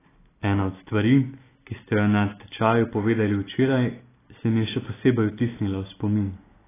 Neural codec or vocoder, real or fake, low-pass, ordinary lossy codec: none; real; 3.6 kHz; AAC, 16 kbps